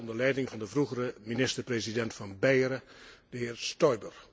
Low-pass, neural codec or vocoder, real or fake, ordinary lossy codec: none; none; real; none